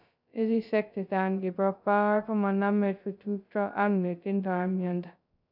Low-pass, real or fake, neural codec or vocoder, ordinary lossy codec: 5.4 kHz; fake; codec, 16 kHz, 0.2 kbps, FocalCodec; none